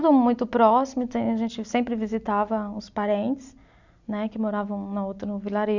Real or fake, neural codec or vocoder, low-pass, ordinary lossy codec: real; none; 7.2 kHz; none